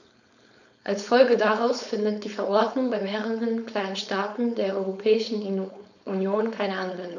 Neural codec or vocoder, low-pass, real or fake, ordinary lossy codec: codec, 16 kHz, 4.8 kbps, FACodec; 7.2 kHz; fake; none